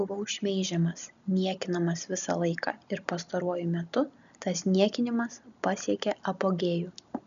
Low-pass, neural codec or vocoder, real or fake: 7.2 kHz; none; real